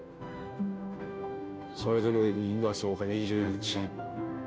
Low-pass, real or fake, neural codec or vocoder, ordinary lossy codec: none; fake; codec, 16 kHz, 0.5 kbps, FunCodec, trained on Chinese and English, 25 frames a second; none